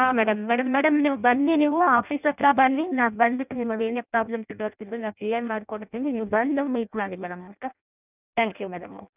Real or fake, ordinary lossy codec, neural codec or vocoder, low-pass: fake; none; codec, 16 kHz in and 24 kHz out, 0.6 kbps, FireRedTTS-2 codec; 3.6 kHz